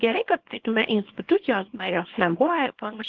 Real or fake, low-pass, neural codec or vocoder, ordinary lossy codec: fake; 7.2 kHz; codec, 24 kHz, 0.9 kbps, WavTokenizer, small release; Opus, 16 kbps